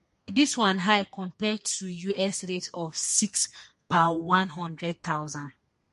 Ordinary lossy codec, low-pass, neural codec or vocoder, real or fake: MP3, 48 kbps; 14.4 kHz; codec, 32 kHz, 1.9 kbps, SNAC; fake